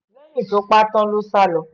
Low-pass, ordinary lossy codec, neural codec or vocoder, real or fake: 7.2 kHz; none; none; real